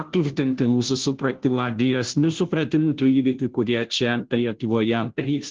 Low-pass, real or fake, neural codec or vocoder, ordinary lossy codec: 7.2 kHz; fake; codec, 16 kHz, 0.5 kbps, FunCodec, trained on Chinese and English, 25 frames a second; Opus, 16 kbps